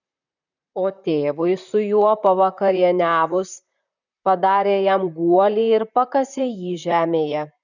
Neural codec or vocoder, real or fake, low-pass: vocoder, 44.1 kHz, 128 mel bands, Pupu-Vocoder; fake; 7.2 kHz